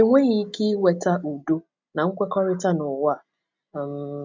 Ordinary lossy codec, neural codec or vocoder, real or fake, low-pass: MP3, 64 kbps; none; real; 7.2 kHz